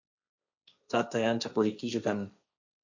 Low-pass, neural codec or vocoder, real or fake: 7.2 kHz; codec, 16 kHz, 1.1 kbps, Voila-Tokenizer; fake